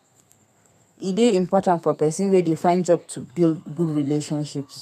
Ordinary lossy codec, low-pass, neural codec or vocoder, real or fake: none; 14.4 kHz; codec, 32 kHz, 1.9 kbps, SNAC; fake